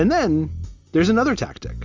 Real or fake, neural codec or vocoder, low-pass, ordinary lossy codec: real; none; 7.2 kHz; Opus, 32 kbps